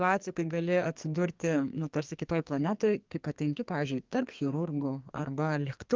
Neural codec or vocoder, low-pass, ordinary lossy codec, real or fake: codec, 44.1 kHz, 2.6 kbps, SNAC; 7.2 kHz; Opus, 32 kbps; fake